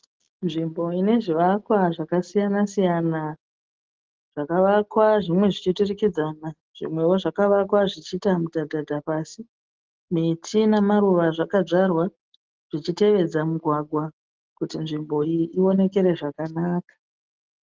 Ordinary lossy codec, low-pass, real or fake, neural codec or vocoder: Opus, 16 kbps; 7.2 kHz; real; none